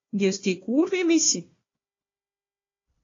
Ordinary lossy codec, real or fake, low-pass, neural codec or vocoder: AAC, 32 kbps; fake; 7.2 kHz; codec, 16 kHz, 1 kbps, FunCodec, trained on Chinese and English, 50 frames a second